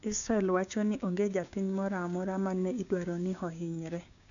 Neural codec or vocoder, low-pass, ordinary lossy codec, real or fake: codec, 16 kHz, 6 kbps, DAC; 7.2 kHz; none; fake